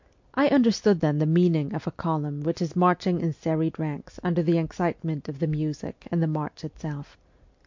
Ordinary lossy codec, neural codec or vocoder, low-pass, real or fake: MP3, 48 kbps; none; 7.2 kHz; real